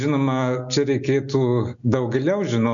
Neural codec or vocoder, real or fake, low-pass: none; real; 7.2 kHz